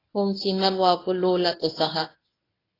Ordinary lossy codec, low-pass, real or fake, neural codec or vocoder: AAC, 24 kbps; 5.4 kHz; fake; codec, 24 kHz, 0.9 kbps, WavTokenizer, medium speech release version 1